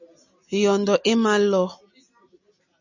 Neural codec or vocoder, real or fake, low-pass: none; real; 7.2 kHz